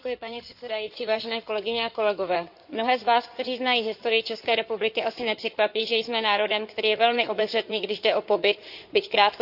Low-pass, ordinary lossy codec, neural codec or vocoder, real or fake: 5.4 kHz; none; codec, 16 kHz in and 24 kHz out, 2.2 kbps, FireRedTTS-2 codec; fake